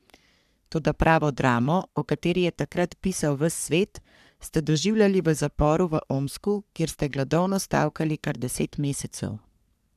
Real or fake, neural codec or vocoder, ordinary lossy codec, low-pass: fake; codec, 44.1 kHz, 3.4 kbps, Pupu-Codec; none; 14.4 kHz